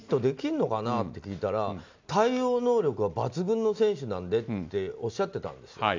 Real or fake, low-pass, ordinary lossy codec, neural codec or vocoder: real; 7.2 kHz; MP3, 64 kbps; none